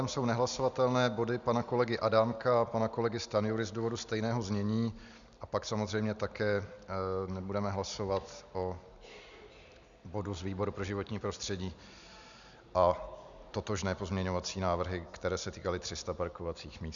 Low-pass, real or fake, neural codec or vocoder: 7.2 kHz; real; none